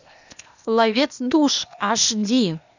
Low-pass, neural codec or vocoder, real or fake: 7.2 kHz; codec, 16 kHz, 0.8 kbps, ZipCodec; fake